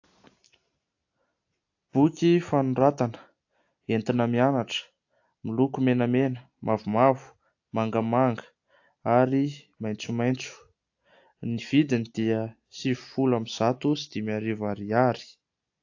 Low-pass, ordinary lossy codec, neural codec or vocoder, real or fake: 7.2 kHz; AAC, 48 kbps; none; real